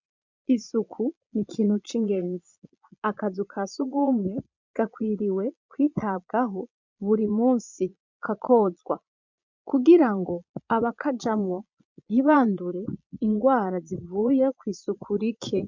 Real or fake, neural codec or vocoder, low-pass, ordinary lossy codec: fake; vocoder, 22.05 kHz, 80 mel bands, Vocos; 7.2 kHz; MP3, 64 kbps